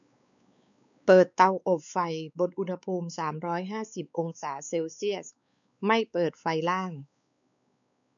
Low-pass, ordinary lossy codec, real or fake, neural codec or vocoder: 7.2 kHz; none; fake; codec, 16 kHz, 4 kbps, X-Codec, WavLM features, trained on Multilingual LibriSpeech